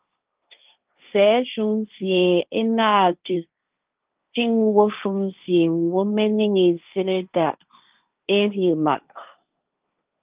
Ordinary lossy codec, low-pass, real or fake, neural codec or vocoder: Opus, 24 kbps; 3.6 kHz; fake; codec, 16 kHz, 1.1 kbps, Voila-Tokenizer